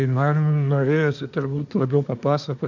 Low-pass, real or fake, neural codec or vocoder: 7.2 kHz; fake; codec, 24 kHz, 1 kbps, SNAC